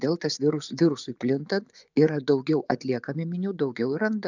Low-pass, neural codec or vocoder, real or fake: 7.2 kHz; vocoder, 24 kHz, 100 mel bands, Vocos; fake